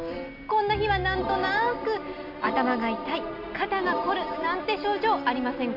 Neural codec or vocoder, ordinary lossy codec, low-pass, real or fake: none; none; 5.4 kHz; real